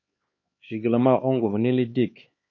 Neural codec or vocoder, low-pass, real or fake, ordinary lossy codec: codec, 16 kHz, 4 kbps, X-Codec, HuBERT features, trained on LibriSpeech; 7.2 kHz; fake; MP3, 32 kbps